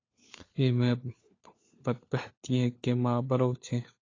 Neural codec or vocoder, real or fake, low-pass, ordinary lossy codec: codec, 16 kHz, 4 kbps, FunCodec, trained on LibriTTS, 50 frames a second; fake; 7.2 kHz; AAC, 32 kbps